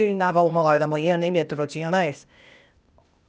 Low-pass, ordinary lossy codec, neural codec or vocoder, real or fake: none; none; codec, 16 kHz, 0.8 kbps, ZipCodec; fake